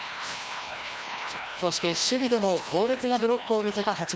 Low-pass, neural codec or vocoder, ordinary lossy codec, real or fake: none; codec, 16 kHz, 1 kbps, FreqCodec, larger model; none; fake